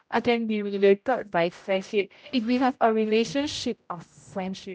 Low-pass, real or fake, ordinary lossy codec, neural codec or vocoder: none; fake; none; codec, 16 kHz, 0.5 kbps, X-Codec, HuBERT features, trained on general audio